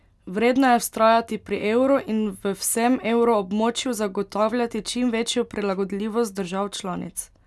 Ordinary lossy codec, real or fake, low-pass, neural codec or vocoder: none; real; none; none